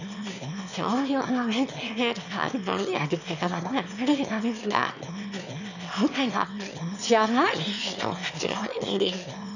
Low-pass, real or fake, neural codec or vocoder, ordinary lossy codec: 7.2 kHz; fake; autoencoder, 22.05 kHz, a latent of 192 numbers a frame, VITS, trained on one speaker; none